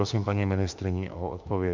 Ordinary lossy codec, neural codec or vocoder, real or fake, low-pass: MP3, 64 kbps; autoencoder, 48 kHz, 32 numbers a frame, DAC-VAE, trained on Japanese speech; fake; 7.2 kHz